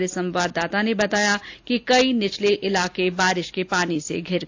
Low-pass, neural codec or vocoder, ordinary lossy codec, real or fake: 7.2 kHz; none; AAC, 48 kbps; real